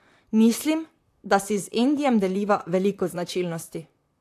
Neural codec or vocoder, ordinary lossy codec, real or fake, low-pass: vocoder, 44.1 kHz, 128 mel bands, Pupu-Vocoder; AAC, 64 kbps; fake; 14.4 kHz